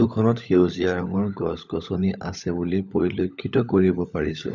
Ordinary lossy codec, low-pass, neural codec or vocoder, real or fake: none; 7.2 kHz; codec, 16 kHz, 16 kbps, FunCodec, trained on LibriTTS, 50 frames a second; fake